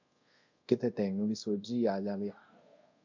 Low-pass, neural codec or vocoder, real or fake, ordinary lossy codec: 7.2 kHz; codec, 24 kHz, 0.5 kbps, DualCodec; fake; MP3, 48 kbps